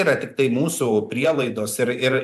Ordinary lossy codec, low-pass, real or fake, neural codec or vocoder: AAC, 96 kbps; 14.4 kHz; fake; vocoder, 44.1 kHz, 128 mel bands every 512 samples, BigVGAN v2